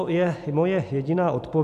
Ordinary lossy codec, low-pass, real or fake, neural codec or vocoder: MP3, 96 kbps; 14.4 kHz; real; none